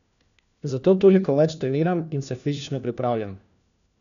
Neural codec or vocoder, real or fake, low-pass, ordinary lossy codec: codec, 16 kHz, 1 kbps, FunCodec, trained on LibriTTS, 50 frames a second; fake; 7.2 kHz; none